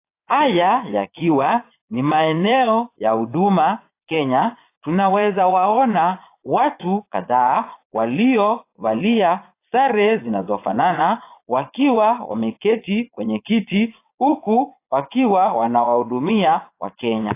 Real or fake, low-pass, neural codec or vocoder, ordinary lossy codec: fake; 3.6 kHz; vocoder, 22.05 kHz, 80 mel bands, Vocos; AAC, 24 kbps